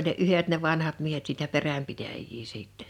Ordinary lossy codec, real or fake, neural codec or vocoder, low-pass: none; real; none; 19.8 kHz